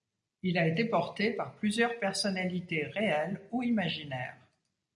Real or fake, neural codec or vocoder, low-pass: real; none; 10.8 kHz